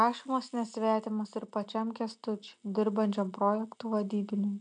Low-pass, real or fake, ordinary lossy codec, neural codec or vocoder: 9.9 kHz; real; MP3, 96 kbps; none